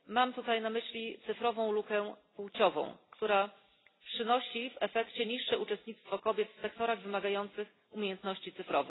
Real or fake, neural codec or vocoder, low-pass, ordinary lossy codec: real; none; 7.2 kHz; AAC, 16 kbps